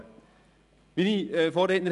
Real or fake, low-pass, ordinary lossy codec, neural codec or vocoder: real; 10.8 kHz; none; none